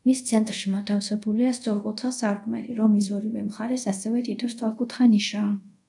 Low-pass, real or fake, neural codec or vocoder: 10.8 kHz; fake; codec, 24 kHz, 0.5 kbps, DualCodec